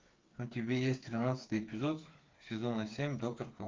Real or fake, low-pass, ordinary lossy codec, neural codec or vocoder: fake; 7.2 kHz; Opus, 32 kbps; codec, 16 kHz, 4 kbps, FreqCodec, smaller model